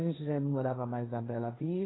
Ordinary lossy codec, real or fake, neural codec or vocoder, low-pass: AAC, 16 kbps; fake; codec, 16 kHz, 1.1 kbps, Voila-Tokenizer; 7.2 kHz